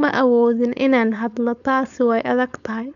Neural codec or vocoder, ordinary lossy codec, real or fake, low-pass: codec, 16 kHz, 4.8 kbps, FACodec; none; fake; 7.2 kHz